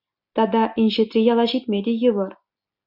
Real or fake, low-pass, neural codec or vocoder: real; 5.4 kHz; none